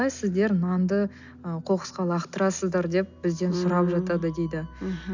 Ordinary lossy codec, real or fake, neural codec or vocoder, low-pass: none; real; none; 7.2 kHz